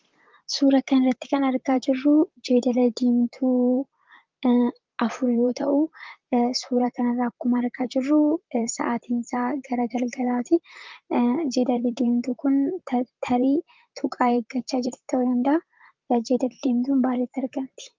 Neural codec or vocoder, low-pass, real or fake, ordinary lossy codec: vocoder, 44.1 kHz, 128 mel bands, Pupu-Vocoder; 7.2 kHz; fake; Opus, 32 kbps